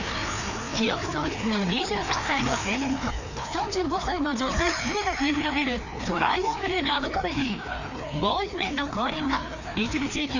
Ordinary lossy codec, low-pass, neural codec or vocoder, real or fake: none; 7.2 kHz; codec, 16 kHz, 2 kbps, FreqCodec, larger model; fake